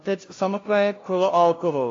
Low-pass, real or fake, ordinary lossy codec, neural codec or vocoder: 7.2 kHz; fake; AAC, 32 kbps; codec, 16 kHz, 0.5 kbps, FunCodec, trained on LibriTTS, 25 frames a second